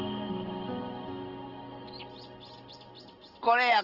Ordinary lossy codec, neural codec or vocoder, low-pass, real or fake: Opus, 16 kbps; none; 5.4 kHz; real